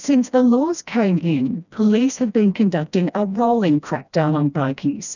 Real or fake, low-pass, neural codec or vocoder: fake; 7.2 kHz; codec, 16 kHz, 1 kbps, FreqCodec, smaller model